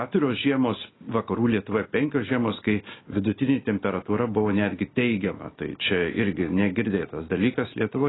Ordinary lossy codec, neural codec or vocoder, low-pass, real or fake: AAC, 16 kbps; none; 7.2 kHz; real